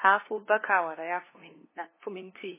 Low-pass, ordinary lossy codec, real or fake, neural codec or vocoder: 3.6 kHz; MP3, 16 kbps; fake; codec, 16 kHz, 2 kbps, FunCodec, trained on LibriTTS, 25 frames a second